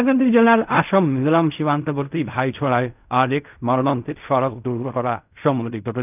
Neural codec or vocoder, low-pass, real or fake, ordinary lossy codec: codec, 16 kHz in and 24 kHz out, 0.4 kbps, LongCat-Audio-Codec, fine tuned four codebook decoder; 3.6 kHz; fake; none